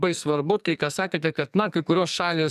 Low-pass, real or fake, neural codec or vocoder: 14.4 kHz; fake; codec, 44.1 kHz, 2.6 kbps, SNAC